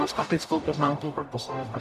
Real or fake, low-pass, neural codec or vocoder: fake; 14.4 kHz; codec, 44.1 kHz, 0.9 kbps, DAC